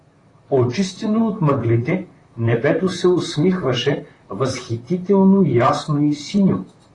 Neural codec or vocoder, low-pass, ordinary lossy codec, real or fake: vocoder, 44.1 kHz, 128 mel bands, Pupu-Vocoder; 10.8 kHz; AAC, 32 kbps; fake